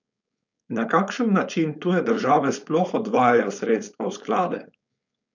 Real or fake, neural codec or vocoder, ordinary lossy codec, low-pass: fake; codec, 16 kHz, 4.8 kbps, FACodec; none; 7.2 kHz